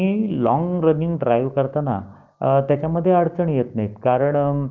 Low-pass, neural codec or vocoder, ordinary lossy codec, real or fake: 7.2 kHz; codec, 16 kHz, 6 kbps, DAC; Opus, 32 kbps; fake